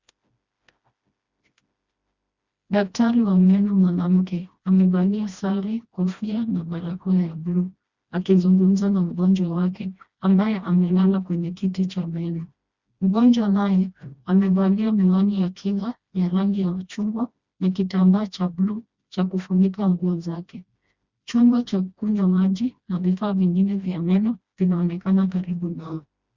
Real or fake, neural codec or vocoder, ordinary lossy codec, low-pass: fake; codec, 16 kHz, 1 kbps, FreqCodec, smaller model; Opus, 64 kbps; 7.2 kHz